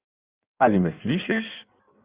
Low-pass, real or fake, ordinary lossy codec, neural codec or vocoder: 3.6 kHz; fake; Opus, 64 kbps; codec, 16 kHz in and 24 kHz out, 1.1 kbps, FireRedTTS-2 codec